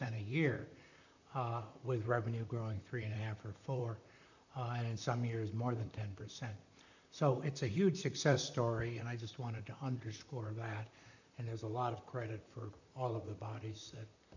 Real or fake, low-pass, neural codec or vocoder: fake; 7.2 kHz; vocoder, 44.1 kHz, 128 mel bands, Pupu-Vocoder